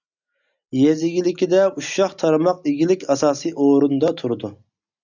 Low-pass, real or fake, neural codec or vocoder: 7.2 kHz; real; none